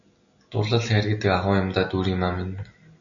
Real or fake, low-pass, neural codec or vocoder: real; 7.2 kHz; none